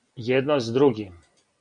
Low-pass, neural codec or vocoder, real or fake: 9.9 kHz; none; real